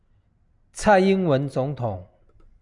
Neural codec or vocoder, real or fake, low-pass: none; real; 10.8 kHz